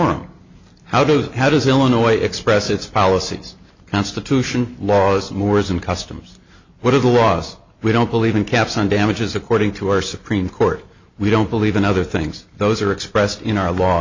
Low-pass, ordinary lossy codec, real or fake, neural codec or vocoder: 7.2 kHz; MP3, 64 kbps; real; none